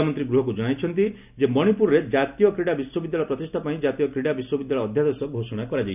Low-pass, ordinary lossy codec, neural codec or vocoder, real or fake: 3.6 kHz; none; none; real